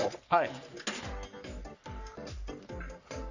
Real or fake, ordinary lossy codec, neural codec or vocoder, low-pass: fake; none; codec, 44.1 kHz, 3.4 kbps, Pupu-Codec; 7.2 kHz